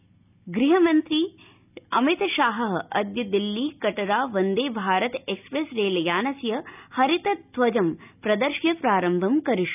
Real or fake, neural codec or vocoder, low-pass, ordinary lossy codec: real; none; 3.6 kHz; none